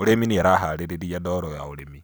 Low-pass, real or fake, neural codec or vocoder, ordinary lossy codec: none; real; none; none